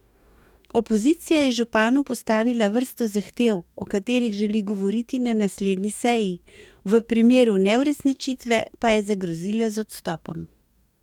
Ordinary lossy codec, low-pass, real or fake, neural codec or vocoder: none; 19.8 kHz; fake; codec, 44.1 kHz, 2.6 kbps, DAC